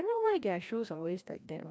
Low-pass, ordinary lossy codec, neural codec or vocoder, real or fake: none; none; codec, 16 kHz, 1 kbps, FreqCodec, larger model; fake